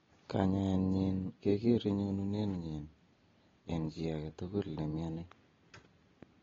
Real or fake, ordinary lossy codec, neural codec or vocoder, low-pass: real; AAC, 24 kbps; none; 7.2 kHz